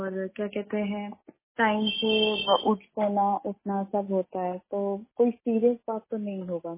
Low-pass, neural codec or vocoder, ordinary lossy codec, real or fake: 3.6 kHz; none; MP3, 16 kbps; real